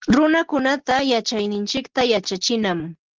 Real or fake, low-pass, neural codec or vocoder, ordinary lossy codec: real; 7.2 kHz; none; Opus, 16 kbps